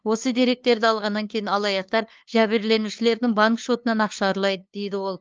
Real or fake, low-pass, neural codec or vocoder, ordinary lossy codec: fake; 7.2 kHz; codec, 16 kHz, 2 kbps, FunCodec, trained on LibriTTS, 25 frames a second; Opus, 24 kbps